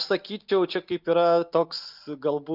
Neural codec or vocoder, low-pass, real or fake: none; 5.4 kHz; real